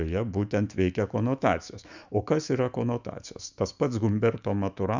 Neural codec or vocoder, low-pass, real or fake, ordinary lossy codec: autoencoder, 48 kHz, 128 numbers a frame, DAC-VAE, trained on Japanese speech; 7.2 kHz; fake; Opus, 64 kbps